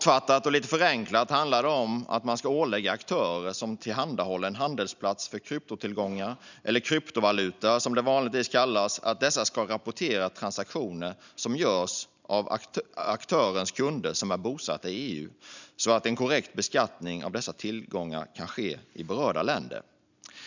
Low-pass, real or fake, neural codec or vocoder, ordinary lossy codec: 7.2 kHz; real; none; none